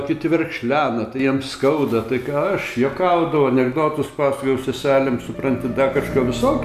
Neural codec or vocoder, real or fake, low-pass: none; real; 14.4 kHz